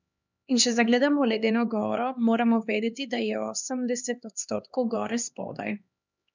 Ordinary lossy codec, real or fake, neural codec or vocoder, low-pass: none; fake; codec, 16 kHz, 2 kbps, X-Codec, HuBERT features, trained on LibriSpeech; 7.2 kHz